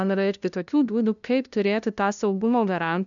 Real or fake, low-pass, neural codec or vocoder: fake; 7.2 kHz; codec, 16 kHz, 0.5 kbps, FunCodec, trained on LibriTTS, 25 frames a second